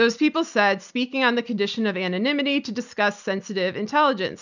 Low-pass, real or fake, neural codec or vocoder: 7.2 kHz; real; none